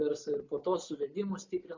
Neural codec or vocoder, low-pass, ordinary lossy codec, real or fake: none; 7.2 kHz; MP3, 64 kbps; real